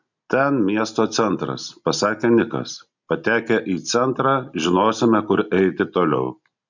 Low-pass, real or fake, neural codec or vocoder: 7.2 kHz; real; none